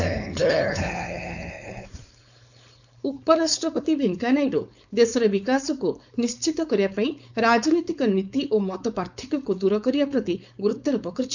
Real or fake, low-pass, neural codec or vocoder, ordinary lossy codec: fake; 7.2 kHz; codec, 16 kHz, 4.8 kbps, FACodec; none